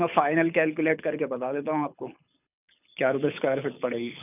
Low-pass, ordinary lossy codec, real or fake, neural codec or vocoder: 3.6 kHz; none; fake; codec, 24 kHz, 6 kbps, HILCodec